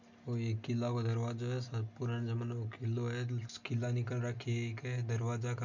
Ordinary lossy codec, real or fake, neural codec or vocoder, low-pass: none; real; none; 7.2 kHz